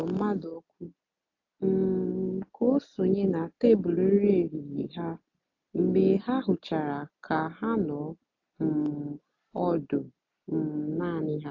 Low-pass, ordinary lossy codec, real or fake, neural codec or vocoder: 7.2 kHz; AAC, 48 kbps; real; none